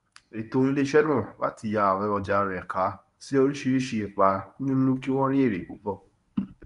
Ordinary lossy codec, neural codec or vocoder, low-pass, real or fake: none; codec, 24 kHz, 0.9 kbps, WavTokenizer, medium speech release version 1; 10.8 kHz; fake